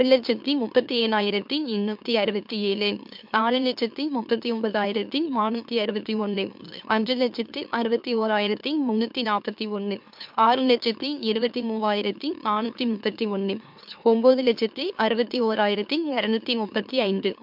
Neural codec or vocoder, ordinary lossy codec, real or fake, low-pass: autoencoder, 44.1 kHz, a latent of 192 numbers a frame, MeloTTS; MP3, 48 kbps; fake; 5.4 kHz